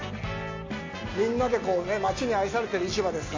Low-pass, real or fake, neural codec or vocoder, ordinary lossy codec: 7.2 kHz; real; none; AAC, 48 kbps